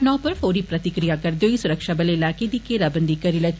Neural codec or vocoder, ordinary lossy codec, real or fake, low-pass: none; none; real; none